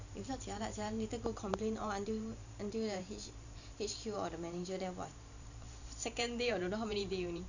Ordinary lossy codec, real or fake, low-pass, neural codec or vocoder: none; fake; 7.2 kHz; vocoder, 44.1 kHz, 128 mel bands every 512 samples, BigVGAN v2